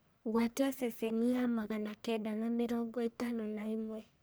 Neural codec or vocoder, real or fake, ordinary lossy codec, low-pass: codec, 44.1 kHz, 1.7 kbps, Pupu-Codec; fake; none; none